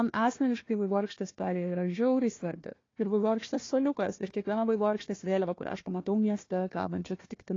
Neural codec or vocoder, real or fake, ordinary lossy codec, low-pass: codec, 16 kHz, 1 kbps, FunCodec, trained on Chinese and English, 50 frames a second; fake; AAC, 32 kbps; 7.2 kHz